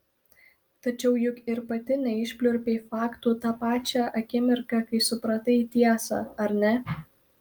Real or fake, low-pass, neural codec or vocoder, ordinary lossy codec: real; 19.8 kHz; none; Opus, 32 kbps